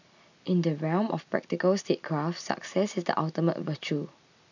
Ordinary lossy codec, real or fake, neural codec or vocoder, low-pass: none; real; none; 7.2 kHz